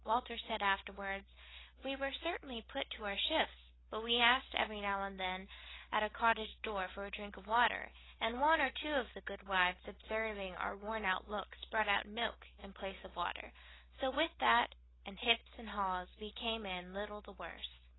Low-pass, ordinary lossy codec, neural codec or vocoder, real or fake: 7.2 kHz; AAC, 16 kbps; none; real